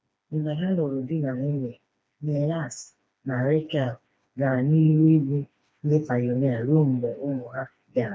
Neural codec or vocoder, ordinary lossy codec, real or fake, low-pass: codec, 16 kHz, 2 kbps, FreqCodec, smaller model; none; fake; none